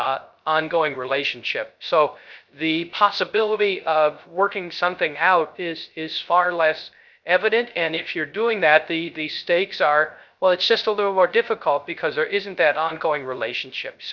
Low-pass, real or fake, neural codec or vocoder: 7.2 kHz; fake; codec, 16 kHz, 0.3 kbps, FocalCodec